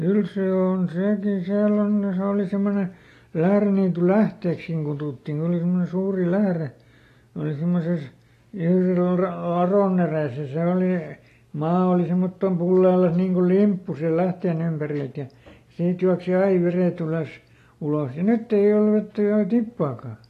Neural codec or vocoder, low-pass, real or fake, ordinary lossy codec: none; 14.4 kHz; real; AAC, 48 kbps